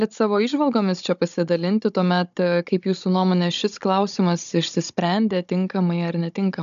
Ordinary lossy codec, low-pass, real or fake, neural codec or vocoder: AAC, 96 kbps; 7.2 kHz; fake; codec, 16 kHz, 16 kbps, FunCodec, trained on Chinese and English, 50 frames a second